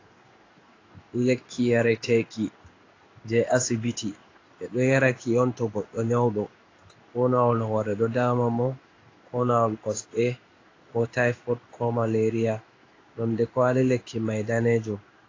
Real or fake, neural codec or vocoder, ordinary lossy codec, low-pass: fake; codec, 16 kHz in and 24 kHz out, 1 kbps, XY-Tokenizer; AAC, 32 kbps; 7.2 kHz